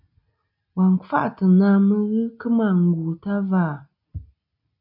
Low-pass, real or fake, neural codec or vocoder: 5.4 kHz; real; none